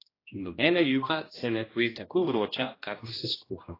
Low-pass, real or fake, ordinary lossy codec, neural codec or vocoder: 5.4 kHz; fake; AAC, 24 kbps; codec, 16 kHz, 1 kbps, X-Codec, HuBERT features, trained on general audio